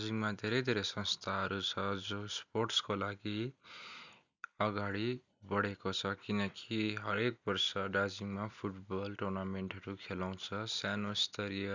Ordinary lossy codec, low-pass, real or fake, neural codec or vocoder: none; 7.2 kHz; real; none